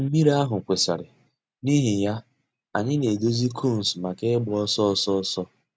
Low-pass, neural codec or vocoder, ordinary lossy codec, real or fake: none; none; none; real